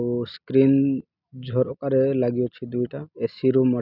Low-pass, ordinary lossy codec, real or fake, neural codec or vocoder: 5.4 kHz; none; real; none